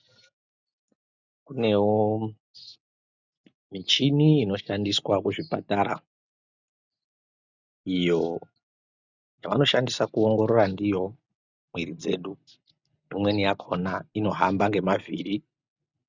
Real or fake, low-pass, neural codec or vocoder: real; 7.2 kHz; none